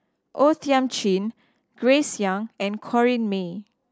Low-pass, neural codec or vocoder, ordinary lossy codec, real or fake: none; none; none; real